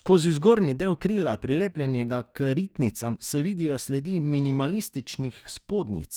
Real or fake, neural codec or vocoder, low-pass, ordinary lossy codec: fake; codec, 44.1 kHz, 2.6 kbps, DAC; none; none